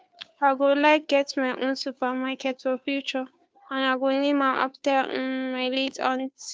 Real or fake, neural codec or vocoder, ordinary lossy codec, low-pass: fake; codec, 16 kHz, 2 kbps, FunCodec, trained on Chinese and English, 25 frames a second; none; none